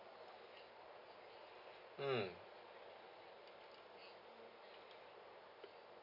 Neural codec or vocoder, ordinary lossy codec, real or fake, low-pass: none; none; real; 5.4 kHz